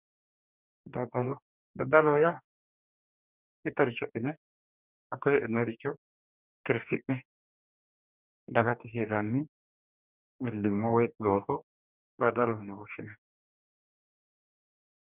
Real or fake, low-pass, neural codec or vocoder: fake; 3.6 kHz; codec, 44.1 kHz, 2.6 kbps, DAC